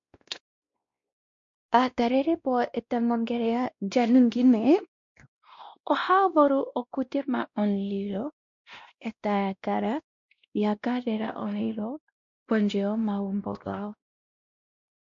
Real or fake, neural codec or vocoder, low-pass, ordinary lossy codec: fake; codec, 16 kHz, 1 kbps, X-Codec, WavLM features, trained on Multilingual LibriSpeech; 7.2 kHz; MP3, 48 kbps